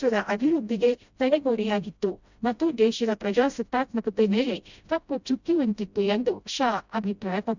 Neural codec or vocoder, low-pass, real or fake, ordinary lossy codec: codec, 16 kHz, 0.5 kbps, FreqCodec, smaller model; 7.2 kHz; fake; none